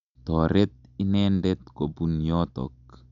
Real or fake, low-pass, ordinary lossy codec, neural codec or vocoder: real; 7.2 kHz; MP3, 64 kbps; none